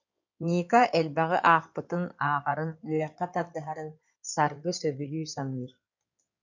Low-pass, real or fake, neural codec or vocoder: 7.2 kHz; fake; codec, 16 kHz in and 24 kHz out, 2.2 kbps, FireRedTTS-2 codec